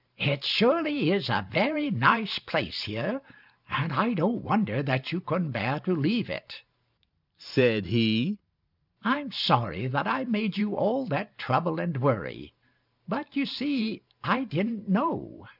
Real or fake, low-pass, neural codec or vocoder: fake; 5.4 kHz; vocoder, 44.1 kHz, 128 mel bands every 512 samples, BigVGAN v2